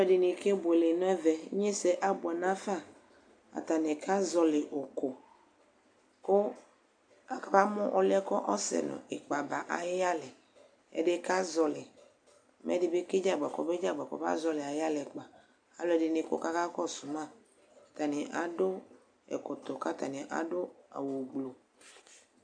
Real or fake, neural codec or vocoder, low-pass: real; none; 9.9 kHz